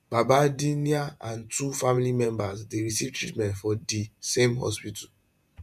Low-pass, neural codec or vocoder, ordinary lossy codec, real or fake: 14.4 kHz; vocoder, 48 kHz, 128 mel bands, Vocos; none; fake